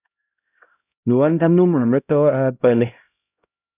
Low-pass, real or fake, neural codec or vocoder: 3.6 kHz; fake; codec, 16 kHz, 0.5 kbps, X-Codec, HuBERT features, trained on LibriSpeech